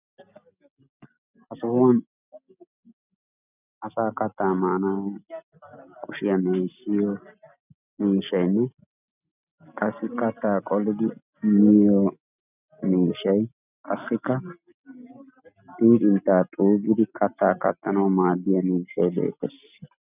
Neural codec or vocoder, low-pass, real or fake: none; 3.6 kHz; real